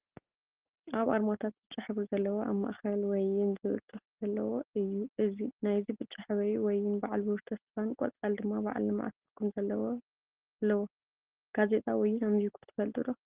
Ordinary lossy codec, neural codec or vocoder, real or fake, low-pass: Opus, 16 kbps; none; real; 3.6 kHz